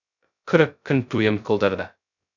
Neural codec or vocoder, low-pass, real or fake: codec, 16 kHz, 0.2 kbps, FocalCodec; 7.2 kHz; fake